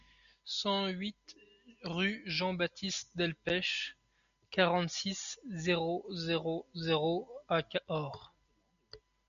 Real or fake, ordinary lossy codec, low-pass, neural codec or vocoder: real; MP3, 96 kbps; 7.2 kHz; none